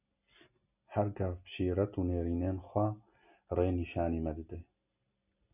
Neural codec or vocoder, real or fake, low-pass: none; real; 3.6 kHz